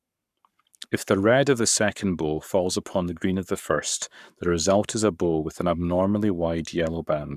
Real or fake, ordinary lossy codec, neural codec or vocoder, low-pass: fake; none; codec, 44.1 kHz, 7.8 kbps, Pupu-Codec; 14.4 kHz